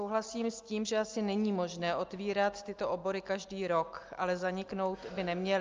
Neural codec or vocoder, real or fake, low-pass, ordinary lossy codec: none; real; 7.2 kHz; Opus, 24 kbps